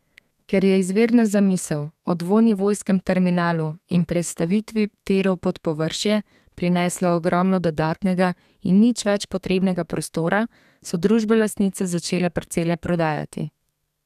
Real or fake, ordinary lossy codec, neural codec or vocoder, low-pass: fake; none; codec, 32 kHz, 1.9 kbps, SNAC; 14.4 kHz